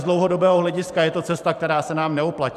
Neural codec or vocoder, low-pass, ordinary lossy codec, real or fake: vocoder, 44.1 kHz, 128 mel bands every 512 samples, BigVGAN v2; 14.4 kHz; AAC, 96 kbps; fake